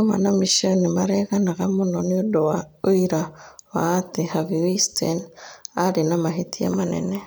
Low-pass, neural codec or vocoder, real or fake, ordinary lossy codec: none; none; real; none